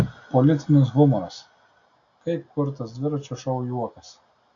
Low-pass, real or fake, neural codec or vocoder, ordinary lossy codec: 7.2 kHz; real; none; AAC, 48 kbps